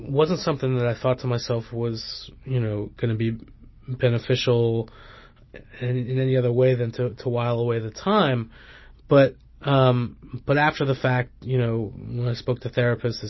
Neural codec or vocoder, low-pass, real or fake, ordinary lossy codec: none; 7.2 kHz; real; MP3, 24 kbps